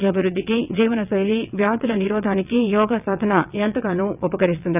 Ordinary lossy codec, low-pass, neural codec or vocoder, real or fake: none; 3.6 kHz; vocoder, 22.05 kHz, 80 mel bands, WaveNeXt; fake